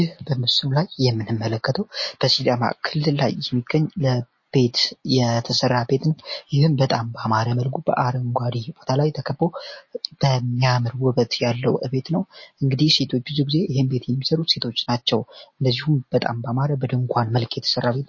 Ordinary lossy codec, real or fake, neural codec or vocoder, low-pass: MP3, 32 kbps; real; none; 7.2 kHz